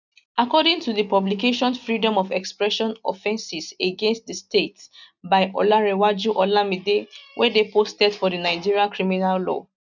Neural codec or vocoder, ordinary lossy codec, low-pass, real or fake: none; none; 7.2 kHz; real